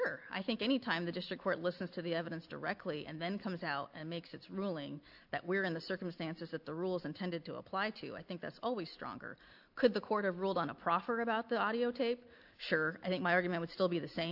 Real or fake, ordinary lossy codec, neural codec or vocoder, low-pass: real; AAC, 48 kbps; none; 5.4 kHz